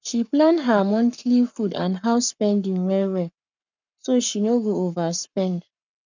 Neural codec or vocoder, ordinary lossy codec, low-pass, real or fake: codec, 44.1 kHz, 7.8 kbps, Pupu-Codec; none; 7.2 kHz; fake